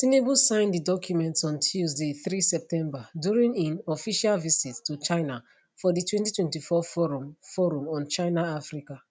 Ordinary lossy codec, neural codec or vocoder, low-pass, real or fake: none; none; none; real